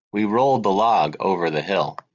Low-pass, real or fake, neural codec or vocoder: 7.2 kHz; real; none